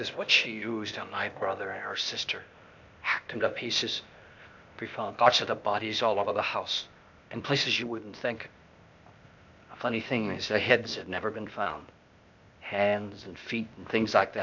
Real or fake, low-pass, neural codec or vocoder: fake; 7.2 kHz; codec, 16 kHz, 0.8 kbps, ZipCodec